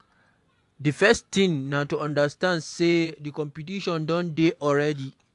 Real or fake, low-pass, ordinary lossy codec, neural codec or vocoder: fake; 10.8 kHz; AAC, 64 kbps; vocoder, 24 kHz, 100 mel bands, Vocos